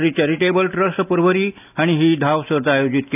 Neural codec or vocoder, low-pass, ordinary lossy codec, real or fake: none; 3.6 kHz; none; real